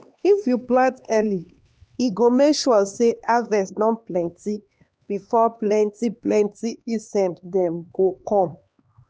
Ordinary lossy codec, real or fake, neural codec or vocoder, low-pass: none; fake; codec, 16 kHz, 2 kbps, X-Codec, HuBERT features, trained on LibriSpeech; none